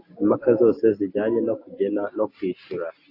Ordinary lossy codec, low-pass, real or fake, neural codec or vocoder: AAC, 32 kbps; 5.4 kHz; real; none